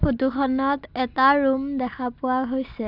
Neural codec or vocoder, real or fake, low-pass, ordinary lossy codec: none; real; 5.4 kHz; none